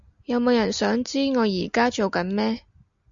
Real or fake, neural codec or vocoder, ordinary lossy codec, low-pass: real; none; Opus, 64 kbps; 7.2 kHz